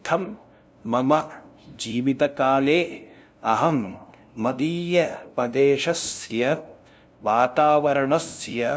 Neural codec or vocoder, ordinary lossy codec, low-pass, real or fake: codec, 16 kHz, 0.5 kbps, FunCodec, trained on LibriTTS, 25 frames a second; none; none; fake